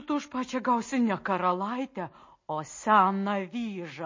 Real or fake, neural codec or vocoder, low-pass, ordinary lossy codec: real; none; 7.2 kHz; MP3, 32 kbps